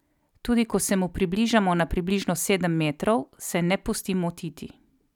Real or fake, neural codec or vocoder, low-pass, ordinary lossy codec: real; none; 19.8 kHz; none